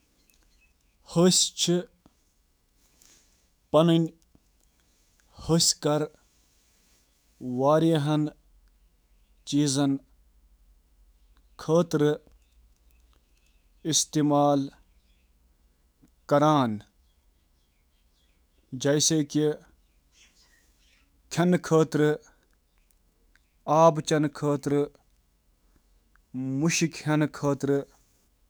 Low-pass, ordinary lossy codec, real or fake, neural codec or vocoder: none; none; fake; autoencoder, 48 kHz, 128 numbers a frame, DAC-VAE, trained on Japanese speech